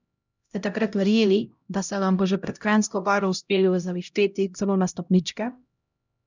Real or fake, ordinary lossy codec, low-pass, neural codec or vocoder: fake; none; 7.2 kHz; codec, 16 kHz, 0.5 kbps, X-Codec, HuBERT features, trained on LibriSpeech